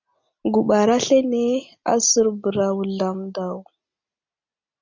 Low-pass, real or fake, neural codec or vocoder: 7.2 kHz; real; none